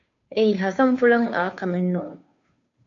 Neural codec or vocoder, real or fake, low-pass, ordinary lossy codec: codec, 16 kHz, 2 kbps, FunCodec, trained on Chinese and English, 25 frames a second; fake; 7.2 kHz; AAC, 48 kbps